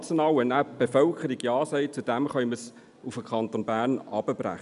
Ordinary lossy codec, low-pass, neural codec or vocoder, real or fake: none; 10.8 kHz; none; real